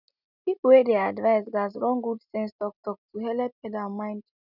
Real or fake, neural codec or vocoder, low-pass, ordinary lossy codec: real; none; 5.4 kHz; none